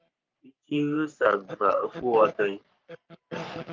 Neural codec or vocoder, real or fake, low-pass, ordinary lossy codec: codec, 44.1 kHz, 3.4 kbps, Pupu-Codec; fake; 7.2 kHz; Opus, 24 kbps